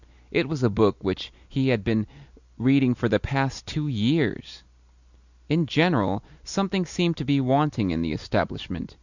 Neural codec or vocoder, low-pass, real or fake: none; 7.2 kHz; real